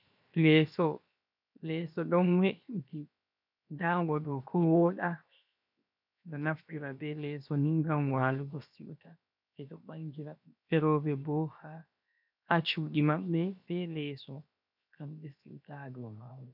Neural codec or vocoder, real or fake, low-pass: codec, 16 kHz, 0.7 kbps, FocalCodec; fake; 5.4 kHz